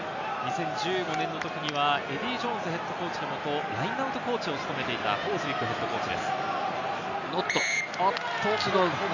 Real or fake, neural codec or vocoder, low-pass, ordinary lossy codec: real; none; 7.2 kHz; none